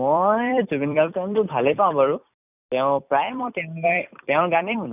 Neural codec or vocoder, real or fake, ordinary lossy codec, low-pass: vocoder, 44.1 kHz, 128 mel bands every 256 samples, BigVGAN v2; fake; none; 3.6 kHz